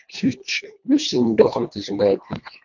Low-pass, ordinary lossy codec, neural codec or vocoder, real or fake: 7.2 kHz; MP3, 48 kbps; codec, 24 kHz, 1.5 kbps, HILCodec; fake